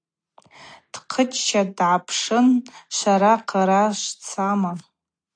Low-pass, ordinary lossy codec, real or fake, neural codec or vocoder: 9.9 kHz; AAC, 48 kbps; real; none